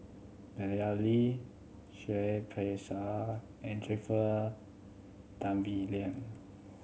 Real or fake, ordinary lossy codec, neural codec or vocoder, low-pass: real; none; none; none